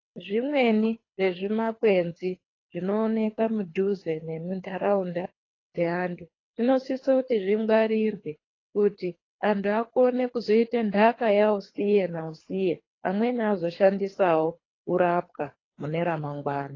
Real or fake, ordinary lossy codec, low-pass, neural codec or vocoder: fake; AAC, 32 kbps; 7.2 kHz; codec, 24 kHz, 3 kbps, HILCodec